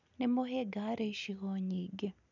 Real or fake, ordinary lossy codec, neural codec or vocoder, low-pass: real; none; none; 7.2 kHz